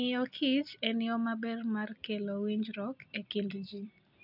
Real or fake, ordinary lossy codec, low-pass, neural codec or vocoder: real; none; 5.4 kHz; none